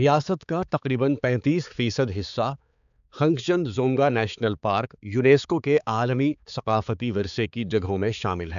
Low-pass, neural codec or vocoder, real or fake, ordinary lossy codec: 7.2 kHz; codec, 16 kHz, 4 kbps, X-Codec, HuBERT features, trained on balanced general audio; fake; none